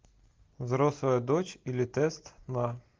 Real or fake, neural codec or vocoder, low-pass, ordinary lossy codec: real; none; 7.2 kHz; Opus, 32 kbps